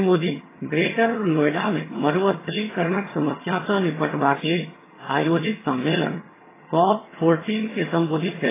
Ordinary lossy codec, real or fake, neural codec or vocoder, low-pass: AAC, 16 kbps; fake; vocoder, 22.05 kHz, 80 mel bands, HiFi-GAN; 3.6 kHz